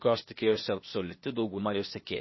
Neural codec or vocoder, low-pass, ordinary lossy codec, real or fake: codec, 16 kHz, 0.8 kbps, ZipCodec; 7.2 kHz; MP3, 24 kbps; fake